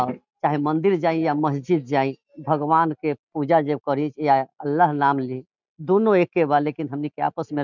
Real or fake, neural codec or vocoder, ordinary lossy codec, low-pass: real; none; none; 7.2 kHz